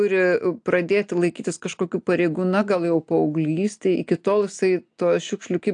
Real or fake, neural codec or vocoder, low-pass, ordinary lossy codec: real; none; 9.9 kHz; AAC, 64 kbps